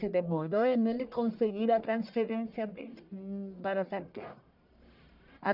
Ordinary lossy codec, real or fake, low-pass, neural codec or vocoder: none; fake; 5.4 kHz; codec, 44.1 kHz, 1.7 kbps, Pupu-Codec